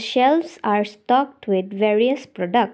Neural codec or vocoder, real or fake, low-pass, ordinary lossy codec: none; real; none; none